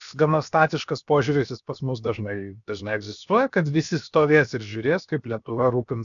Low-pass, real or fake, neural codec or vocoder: 7.2 kHz; fake; codec, 16 kHz, 0.7 kbps, FocalCodec